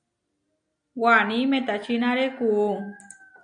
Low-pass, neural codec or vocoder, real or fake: 9.9 kHz; none; real